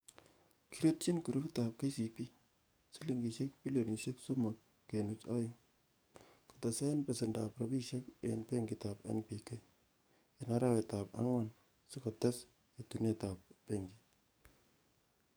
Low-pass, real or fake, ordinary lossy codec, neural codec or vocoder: none; fake; none; codec, 44.1 kHz, 7.8 kbps, DAC